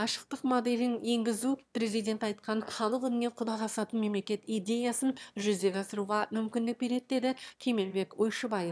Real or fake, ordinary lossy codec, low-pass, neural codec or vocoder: fake; none; none; autoencoder, 22.05 kHz, a latent of 192 numbers a frame, VITS, trained on one speaker